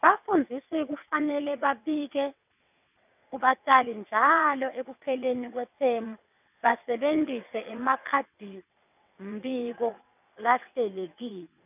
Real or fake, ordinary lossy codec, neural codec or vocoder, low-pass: fake; none; vocoder, 22.05 kHz, 80 mel bands, WaveNeXt; 3.6 kHz